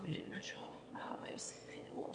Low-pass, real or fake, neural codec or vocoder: 9.9 kHz; fake; autoencoder, 22.05 kHz, a latent of 192 numbers a frame, VITS, trained on one speaker